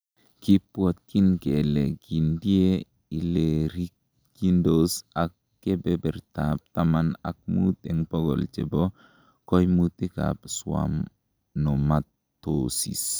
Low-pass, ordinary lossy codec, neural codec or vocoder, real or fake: none; none; none; real